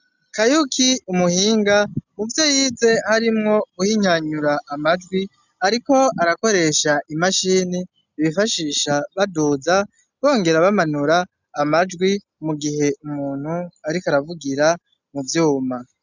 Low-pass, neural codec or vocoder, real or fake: 7.2 kHz; none; real